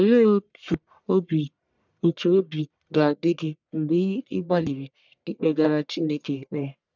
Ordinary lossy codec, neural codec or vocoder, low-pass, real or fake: none; codec, 44.1 kHz, 1.7 kbps, Pupu-Codec; 7.2 kHz; fake